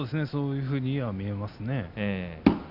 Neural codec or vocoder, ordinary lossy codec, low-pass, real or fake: none; none; 5.4 kHz; real